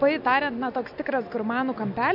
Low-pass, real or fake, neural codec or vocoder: 5.4 kHz; real; none